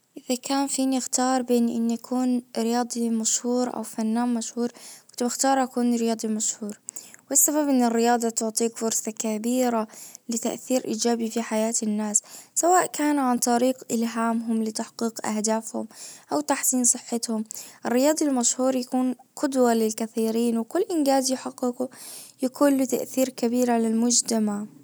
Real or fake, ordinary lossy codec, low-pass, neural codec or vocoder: real; none; none; none